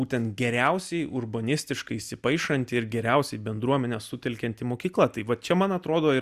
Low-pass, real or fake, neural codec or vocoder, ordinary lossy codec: 14.4 kHz; real; none; Opus, 64 kbps